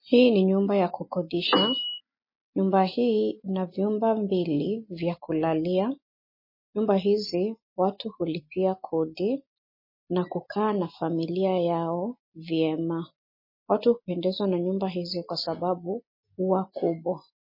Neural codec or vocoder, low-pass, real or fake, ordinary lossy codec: none; 5.4 kHz; real; MP3, 24 kbps